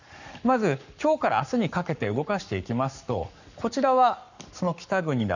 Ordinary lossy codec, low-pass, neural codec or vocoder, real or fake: none; 7.2 kHz; codec, 44.1 kHz, 7.8 kbps, Pupu-Codec; fake